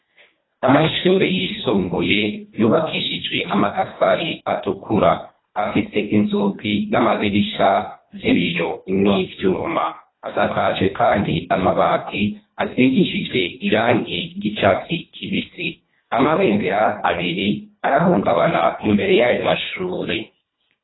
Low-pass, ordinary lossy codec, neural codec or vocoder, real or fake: 7.2 kHz; AAC, 16 kbps; codec, 24 kHz, 1.5 kbps, HILCodec; fake